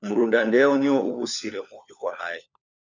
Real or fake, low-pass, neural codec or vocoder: fake; 7.2 kHz; codec, 16 kHz, 4 kbps, FunCodec, trained on LibriTTS, 50 frames a second